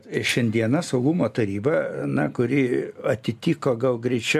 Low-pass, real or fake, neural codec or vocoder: 14.4 kHz; real; none